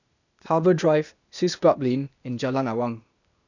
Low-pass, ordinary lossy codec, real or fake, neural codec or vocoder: 7.2 kHz; none; fake; codec, 16 kHz, 0.8 kbps, ZipCodec